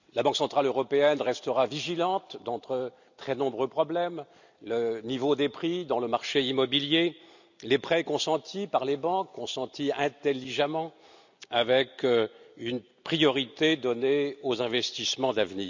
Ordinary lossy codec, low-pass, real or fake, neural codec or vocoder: none; 7.2 kHz; real; none